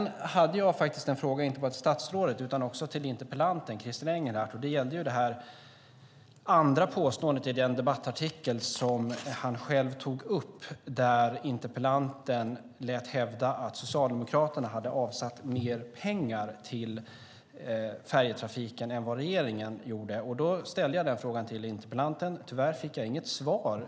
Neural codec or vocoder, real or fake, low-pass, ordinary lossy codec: none; real; none; none